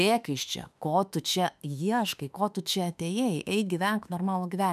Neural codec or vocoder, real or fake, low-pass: autoencoder, 48 kHz, 32 numbers a frame, DAC-VAE, trained on Japanese speech; fake; 14.4 kHz